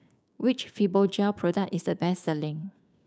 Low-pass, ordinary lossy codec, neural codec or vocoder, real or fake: none; none; codec, 16 kHz, 6 kbps, DAC; fake